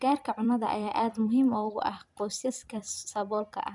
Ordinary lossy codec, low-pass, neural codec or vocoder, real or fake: none; 10.8 kHz; vocoder, 44.1 kHz, 128 mel bands every 256 samples, BigVGAN v2; fake